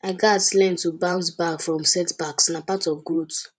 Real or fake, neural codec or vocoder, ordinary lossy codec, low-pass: fake; vocoder, 44.1 kHz, 128 mel bands every 512 samples, BigVGAN v2; none; 10.8 kHz